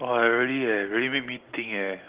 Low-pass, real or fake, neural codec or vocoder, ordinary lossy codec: 3.6 kHz; real; none; Opus, 16 kbps